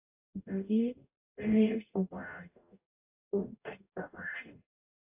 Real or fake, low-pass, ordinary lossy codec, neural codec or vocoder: fake; 3.6 kHz; AAC, 24 kbps; codec, 44.1 kHz, 0.9 kbps, DAC